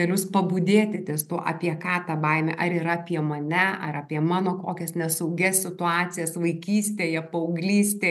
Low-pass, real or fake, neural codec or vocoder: 14.4 kHz; real; none